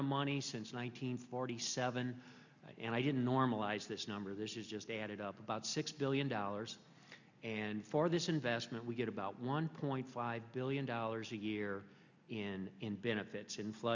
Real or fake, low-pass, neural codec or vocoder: real; 7.2 kHz; none